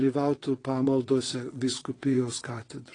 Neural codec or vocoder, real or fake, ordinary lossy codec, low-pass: vocoder, 22.05 kHz, 80 mel bands, WaveNeXt; fake; AAC, 32 kbps; 9.9 kHz